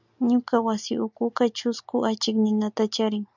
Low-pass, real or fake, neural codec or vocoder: 7.2 kHz; real; none